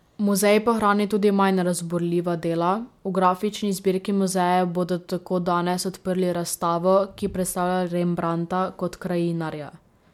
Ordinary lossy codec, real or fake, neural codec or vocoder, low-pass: MP3, 96 kbps; real; none; 19.8 kHz